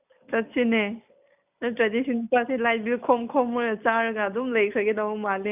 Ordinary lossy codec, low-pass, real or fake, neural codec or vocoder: none; 3.6 kHz; real; none